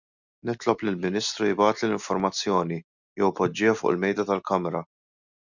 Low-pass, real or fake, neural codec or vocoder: 7.2 kHz; real; none